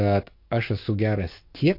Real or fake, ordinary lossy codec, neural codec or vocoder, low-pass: fake; MP3, 32 kbps; codec, 44.1 kHz, 7.8 kbps, DAC; 5.4 kHz